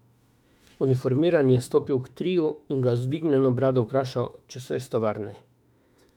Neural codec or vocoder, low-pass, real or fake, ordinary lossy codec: autoencoder, 48 kHz, 32 numbers a frame, DAC-VAE, trained on Japanese speech; 19.8 kHz; fake; none